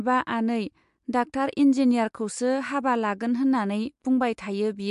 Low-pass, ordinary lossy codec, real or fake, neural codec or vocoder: 10.8 kHz; MP3, 64 kbps; real; none